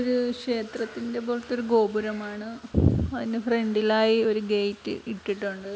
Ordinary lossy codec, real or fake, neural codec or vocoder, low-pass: none; real; none; none